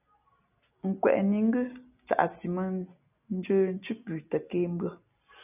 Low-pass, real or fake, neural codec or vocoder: 3.6 kHz; real; none